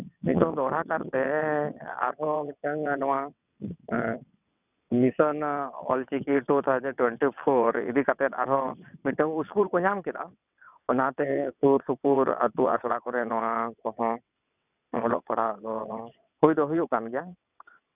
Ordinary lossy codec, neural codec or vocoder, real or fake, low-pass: none; vocoder, 22.05 kHz, 80 mel bands, WaveNeXt; fake; 3.6 kHz